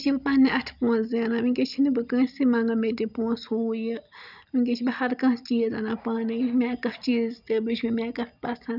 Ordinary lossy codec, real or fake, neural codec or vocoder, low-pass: none; real; none; 5.4 kHz